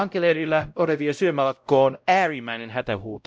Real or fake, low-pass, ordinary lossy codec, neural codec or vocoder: fake; none; none; codec, 16 kHz, 0.5 kbps, X-Codec, WavLM features, trained on Multilingual LibriSpeech